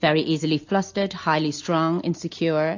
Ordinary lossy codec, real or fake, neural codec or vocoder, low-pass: AAC, 48 kbps; real; none; 7.2 kHz